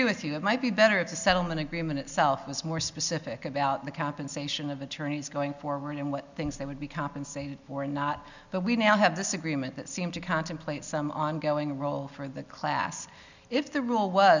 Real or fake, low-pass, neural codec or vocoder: real; 7.2 kHz; none